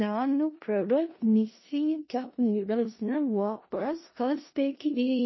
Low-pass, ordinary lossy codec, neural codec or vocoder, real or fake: 7.2 kHz; MP3, 24 kbps; codec, 16 kHz in and 24 kHz out, 0.4 kbps, LongCat-Audio-Codec, four codebook decoder; fake